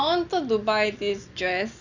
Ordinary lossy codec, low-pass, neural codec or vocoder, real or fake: none; 7.2 kHz; none; real